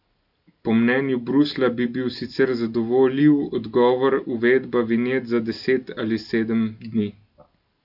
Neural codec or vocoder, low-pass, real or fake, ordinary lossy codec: none; 5.4 kHz; real; none